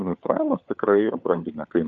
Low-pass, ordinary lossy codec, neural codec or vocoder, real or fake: 7.2 kHz; AAC, 64 kbps; codec, 16 kHz, 4 kbps, FunCodec, trained on LibriTTS, 50 frames a second; fake